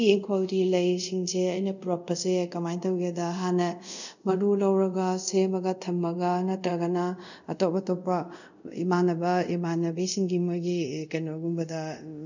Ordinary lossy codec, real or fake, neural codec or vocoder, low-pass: none; fake; codec, 24 kHz, 0.5 kbps, DualCodec; 7.2 kHz